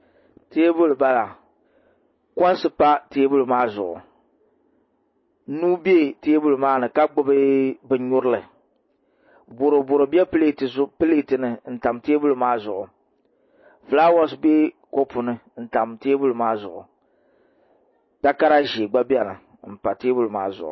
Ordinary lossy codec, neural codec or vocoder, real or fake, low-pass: MP3, 24 kbps; none; real; 7.2 kHz